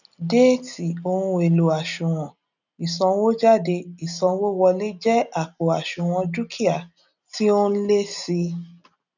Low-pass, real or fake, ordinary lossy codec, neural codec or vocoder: 7.2 kHz; real; none; none